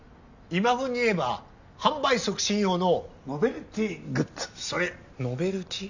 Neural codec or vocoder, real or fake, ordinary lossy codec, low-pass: none; real; none; 7.2 kHz